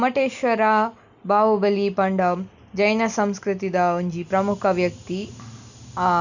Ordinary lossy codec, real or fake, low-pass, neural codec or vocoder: none; real; 7.2 kHz; none